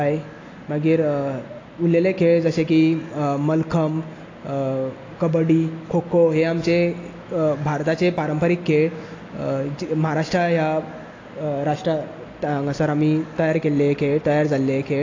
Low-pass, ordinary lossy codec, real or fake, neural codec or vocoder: 7.2 kHz; AAC, 32 kbps; real; none